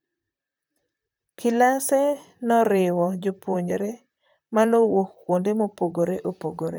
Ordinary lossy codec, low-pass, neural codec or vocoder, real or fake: none; none; vocoder, 44.1 kHz, 128 mel bands, Pupu-Vocoder; fake